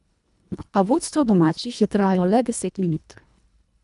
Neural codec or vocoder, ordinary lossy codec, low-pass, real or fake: codec, 24 kHz, 1.5 kbps, HILCodec; none; 10.8 kHz; fake